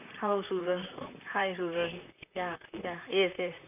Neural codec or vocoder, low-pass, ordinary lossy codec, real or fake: vocoder, 44.1 kHz, 128 mel bands, Pupu-Vocoder; 3.6 kHz; none; fake